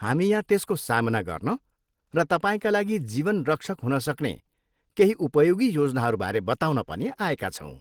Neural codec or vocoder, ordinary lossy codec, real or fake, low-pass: none; Opus, 16 kbps; real; 19.8 kHz